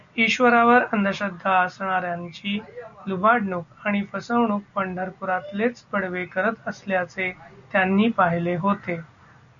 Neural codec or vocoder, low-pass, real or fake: none; 7.2 kHz; real